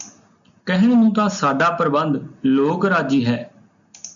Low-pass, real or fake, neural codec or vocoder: 7.2 kHz; real; none